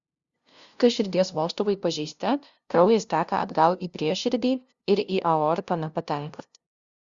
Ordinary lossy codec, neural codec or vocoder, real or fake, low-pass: Opus, 64 kbps; codec, 16 kHz, 0.5 kbps, FunCodec, trained on LibriTTS, 25 frames a second; fake; 7.2 kHz